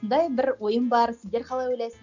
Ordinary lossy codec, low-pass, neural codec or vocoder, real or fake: none; 7.2 kHz; none; real